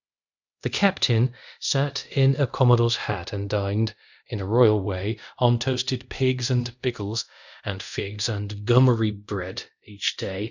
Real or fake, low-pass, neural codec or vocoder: fake; 7.2 kHz; codec, 24 kHz, 0.5 kbps, DualCodec